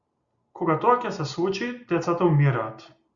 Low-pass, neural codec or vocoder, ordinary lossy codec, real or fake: 7.2 kHz; none; Opus, 64 kbps; real